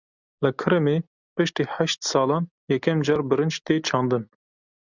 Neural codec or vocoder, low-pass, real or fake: none; 7.2 kHz; real